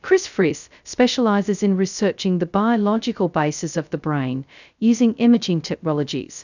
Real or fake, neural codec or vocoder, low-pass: fake; codec, 16 kHz, 0.2 kbps, FocalCodec; 7.2 kHz